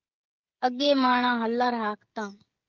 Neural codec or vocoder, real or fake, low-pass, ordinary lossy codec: codec, 16 kHz, 16 kbps, FreqCodec, smaller model; fake; 7.2 kHz; Opus, 24 kbps